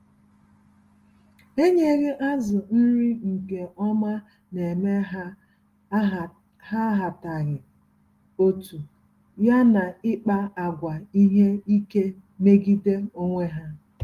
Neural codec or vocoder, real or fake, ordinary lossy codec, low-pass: none; real; Opus, 24 kbps; 14.4 kHz